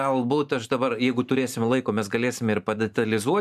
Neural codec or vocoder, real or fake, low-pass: vocoder, 44.1 kHz, 128 mel bands every 512 samples, BigVGAN v2; fake; 14.4 kHz